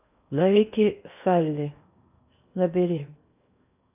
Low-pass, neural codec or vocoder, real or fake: 3.6 kHz; codec, 16 kHz in and 24 kHz out, 0.8 kbps, FocalCodec, streaming, 65536 codes; fake